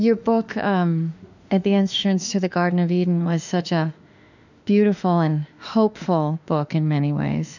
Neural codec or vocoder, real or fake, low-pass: autoencoder, 48 kHz, 32 numbers a frame, DAC-VAE, trained on Japanese speech; fake; 7.2 kHz